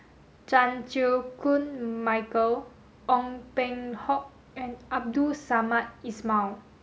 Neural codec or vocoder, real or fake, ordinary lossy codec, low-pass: none; real; none; none